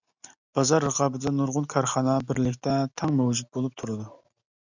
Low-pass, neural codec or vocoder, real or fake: 7.2 kHz; none; real